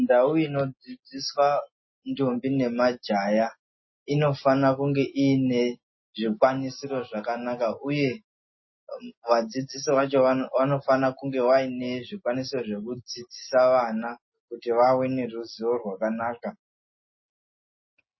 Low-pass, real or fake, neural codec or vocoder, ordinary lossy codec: 7.2 kHz; real; none; MP3, 24 kbps